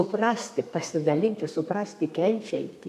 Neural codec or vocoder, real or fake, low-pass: codec, 32 kHz, 1.9 kbps, SNAC; fake; 14.4 kHz